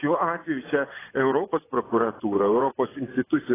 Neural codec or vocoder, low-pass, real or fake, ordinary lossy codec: vocoder, 24 kHz, 100 mel bands, Vocos; 3.6 kHz; fake; AAC, 16 kbps